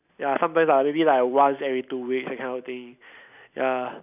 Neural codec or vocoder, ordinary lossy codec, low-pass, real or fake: none; none; 3.6 kHz; real